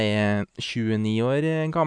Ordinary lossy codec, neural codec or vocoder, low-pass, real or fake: none; none; 9.9 kHz; real